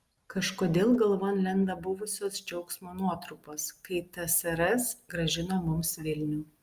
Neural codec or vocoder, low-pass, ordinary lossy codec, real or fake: none; 14.4 kHz; Opus, 32 kbps; real